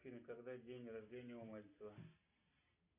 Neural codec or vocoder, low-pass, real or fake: none; 3.6 kHz; real